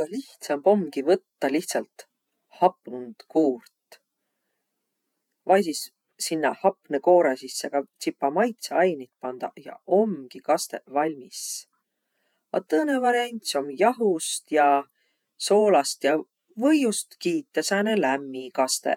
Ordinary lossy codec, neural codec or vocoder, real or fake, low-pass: none; vocoder, 48 kHz, 128 mel bands, Vocos; fake; 19.8 kHz